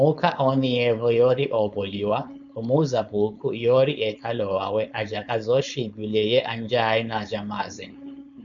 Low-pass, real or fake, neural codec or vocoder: 7.2 kHz; fake; codec, 16 kHz, 4.8 kbps, FACodec